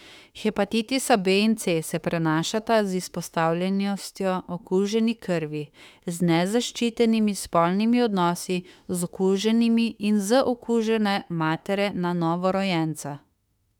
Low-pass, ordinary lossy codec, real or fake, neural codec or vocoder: 19.8 kHz; none; fake; autoencoder, 48 kHz, 32 numbers a frame, DAC-VAE, trained on Japanese speech